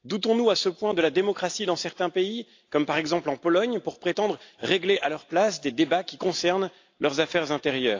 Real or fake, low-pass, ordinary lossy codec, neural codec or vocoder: real; 7.2 kHz; AAC, 48 kbps; none